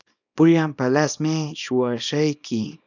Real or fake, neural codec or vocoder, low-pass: fake; codec, 24 kHz, 0.9 kbps, WavTokenizer, small release; 7.2 kHz